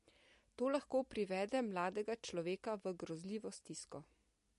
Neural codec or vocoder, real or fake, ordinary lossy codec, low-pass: none; real; MP3, 48 kbps; 14.4 kHz